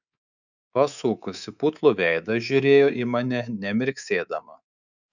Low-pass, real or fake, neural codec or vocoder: 7.2 kHz; fake; codec, 24 kHz, 3.1 kbps, DualCodec